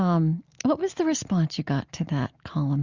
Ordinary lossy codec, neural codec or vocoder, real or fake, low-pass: Opus, 64 kbps; none; real; 7.2 kHz